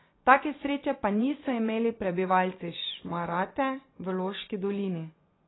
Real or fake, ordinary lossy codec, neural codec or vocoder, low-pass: fake; AAC, 16 kbps; vocoder, 24 kHz, 100 mel bands, Vocos; 7.2 kHz